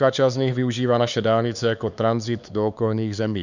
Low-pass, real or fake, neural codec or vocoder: 7.2 kHz; fake; codec, 16 kHz, 4 kbps, X-Codec, HuBERT features, trained on LibriSpeech